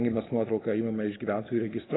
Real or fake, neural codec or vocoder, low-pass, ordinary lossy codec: real; none; 7.2 kHz; AAC, 16 kbps